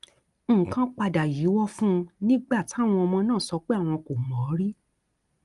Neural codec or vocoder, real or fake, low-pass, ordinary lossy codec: none; real; 10.8 kHz; Opus, 32 kbps